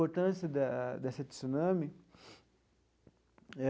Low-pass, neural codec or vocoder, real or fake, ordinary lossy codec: none; none; real; none